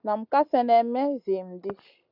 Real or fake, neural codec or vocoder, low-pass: real; none; 5.4 kHz